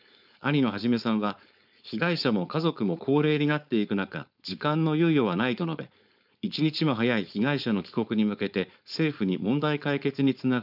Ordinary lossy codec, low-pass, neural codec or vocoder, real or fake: none; 5.4 kHz; codec, 16 kHz, 4.8 kbps, FACodec; fake